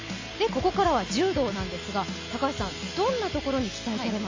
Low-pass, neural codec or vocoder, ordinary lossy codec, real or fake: 7.2 kHz; none; AAC, 48 kbps; real